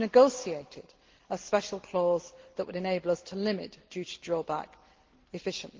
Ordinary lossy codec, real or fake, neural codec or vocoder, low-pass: Opus, 16 kbps; real; none; 7.2 kHz